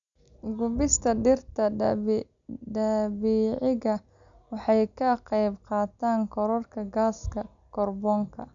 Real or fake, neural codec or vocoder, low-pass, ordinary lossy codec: real; none; 7.2 kHz; none